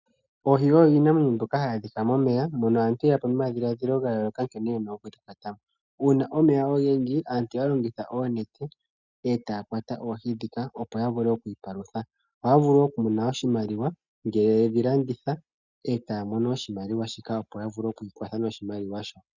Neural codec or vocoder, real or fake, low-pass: none; real; 7.2 kHz